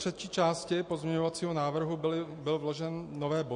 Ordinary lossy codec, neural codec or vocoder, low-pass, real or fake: MP3, 48 kbps; none; 9.9 kHz; real